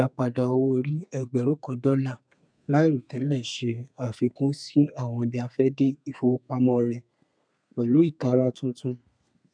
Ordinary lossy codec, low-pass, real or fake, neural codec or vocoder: none; 9.9 kHz; fake; codec, 32 kHz, 1.9 kbps, SNAC